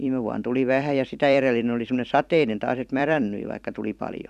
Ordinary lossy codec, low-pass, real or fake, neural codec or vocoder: none; 14.4 kHz; fake; vocoder, 44.1 kHz, 128 mel bands every 256 samples, BigVGAN v2